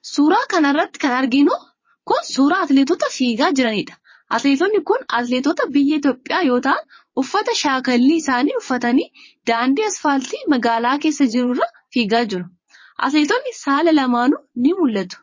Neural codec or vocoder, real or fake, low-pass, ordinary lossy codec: vocoder, 22.05 kHz, 80 mel bands, WaveNeXt; fake; 7.2 kHz; MP3, 32 kbps